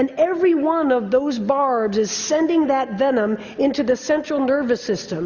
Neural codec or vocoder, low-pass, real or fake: none; 7.2 kHz; real